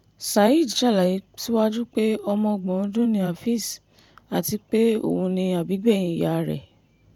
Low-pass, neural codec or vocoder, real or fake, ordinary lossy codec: 19.8 kHz; vocoder, 44.1 kHz, 128 mel bands every 512 samples, BigVGAN v2; fake; none